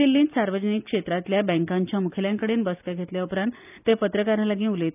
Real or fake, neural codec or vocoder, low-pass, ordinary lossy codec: real; none; 3.6 kHz; none